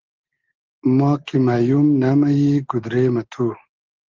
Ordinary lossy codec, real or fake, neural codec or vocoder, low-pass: Opus, 16 kbps; real; none; 7.2 kHz